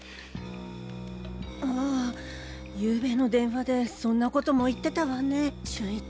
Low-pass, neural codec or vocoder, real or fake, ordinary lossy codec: none; none; real; none